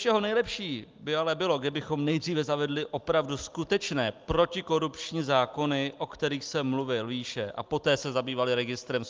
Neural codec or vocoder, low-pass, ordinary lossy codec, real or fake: none; 7.2 kHz; Opus, 32 kbps; real